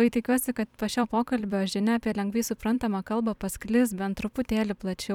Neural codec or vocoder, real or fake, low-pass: vocoder, 44.1 kHz, 128 mel bands every 256 samples, BigVGAN v2; fake; 19.8 kHz